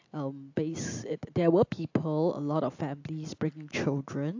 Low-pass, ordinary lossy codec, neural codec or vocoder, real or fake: 7.2 kHz; AAC, 48 kbps; none; real